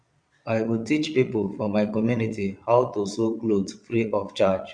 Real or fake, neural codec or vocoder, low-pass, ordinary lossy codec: fake; vocoder, 22.05 kHz, 80 mel bands, Vocos; 9.9 kHz; none